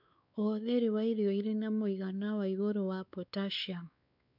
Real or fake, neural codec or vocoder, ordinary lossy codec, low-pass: fake; codec, 16 kHz, 4 kbps, X-Codec, WavLM features, trained on Multilingual LibriSpeech; none; 5.4 kHz